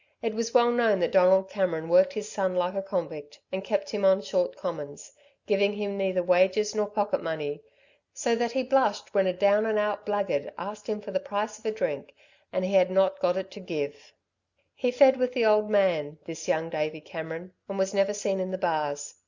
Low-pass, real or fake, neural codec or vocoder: 7.2 kHz; real; none